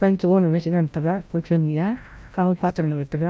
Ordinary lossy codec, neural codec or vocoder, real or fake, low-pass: none; codec, 16 kHz, 0.5 kbps, FreqCodec, larger model; fake; none